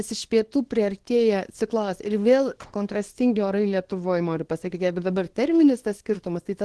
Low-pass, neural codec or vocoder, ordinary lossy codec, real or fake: 10.8 kHz; codec, 24 kHz, 0.9 kbps, WavTokenizer, small release; Opus, 16 kbps; fake